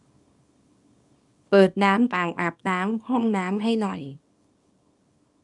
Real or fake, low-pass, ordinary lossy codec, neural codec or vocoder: fake; 10.8 kHz; none; codec, 24 kHz, 0.9 kbps, WavTokenizer, small release